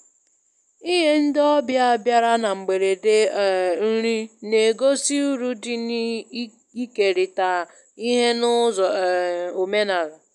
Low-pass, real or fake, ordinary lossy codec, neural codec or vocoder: 10.8 kHz; real; none; none